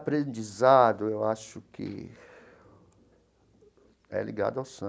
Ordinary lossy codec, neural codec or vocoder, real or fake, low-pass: none; none; real; none